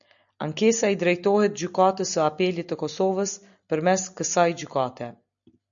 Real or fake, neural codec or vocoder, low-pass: real; none; 7.2 kHz